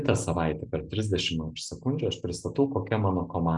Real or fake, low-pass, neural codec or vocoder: real; 10.8 kHz; none